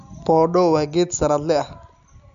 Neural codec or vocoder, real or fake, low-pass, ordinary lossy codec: none; real; 7.2 kHz; none